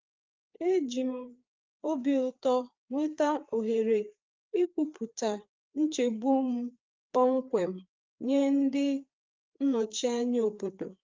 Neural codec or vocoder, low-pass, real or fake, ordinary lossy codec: codec, 16 kHz, 4 kbps, FreqCodec, larger model; 7.2 kHz; fake; Opus, 24 kbps